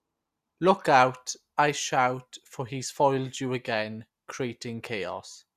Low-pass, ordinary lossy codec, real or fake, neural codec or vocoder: 14.4 kHz; Opus, 64 kbps; real; none